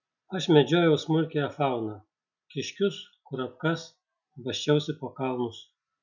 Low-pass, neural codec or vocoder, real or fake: 7.2 kHz; none; real